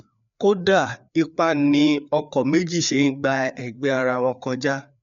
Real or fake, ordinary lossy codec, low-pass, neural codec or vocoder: fake; MP3, 64 kbps; 7.2 kHz; codec, 16 kHz, 4 kbps, FreqCodec, larger model